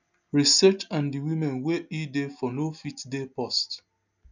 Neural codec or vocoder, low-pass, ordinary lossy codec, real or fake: none; 7.2 kHz; none; real